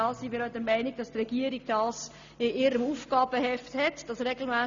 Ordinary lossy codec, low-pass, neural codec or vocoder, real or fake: Opus, 64 kbps; 7.2 kHz; none; real